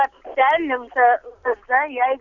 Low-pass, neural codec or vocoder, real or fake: 7.2 kHz; none; real